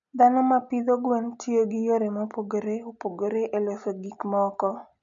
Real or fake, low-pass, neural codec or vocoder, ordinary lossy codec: real; 7.2 kHz; none; none